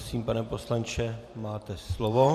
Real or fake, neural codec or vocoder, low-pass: fake; vocoder, 44.1 kHz, 128 mel bands every 512 samples, BigVGAN v2; 14.4 kHz